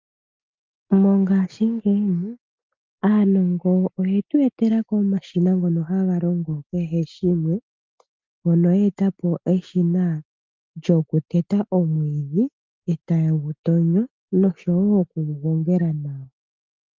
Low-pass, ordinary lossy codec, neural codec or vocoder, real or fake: 7.2 kHz; Opus, 24 kbps; none; real